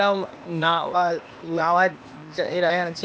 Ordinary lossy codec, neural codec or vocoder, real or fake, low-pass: none; codec, 16 kHz, 0.8 kbps, ZipCodec; fake; none